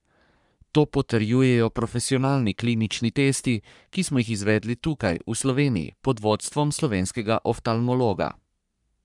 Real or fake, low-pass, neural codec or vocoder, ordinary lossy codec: fake; 10.8 kHz; codec, 44.1 kHz, 3.4 kbps, Pupu-Codec; none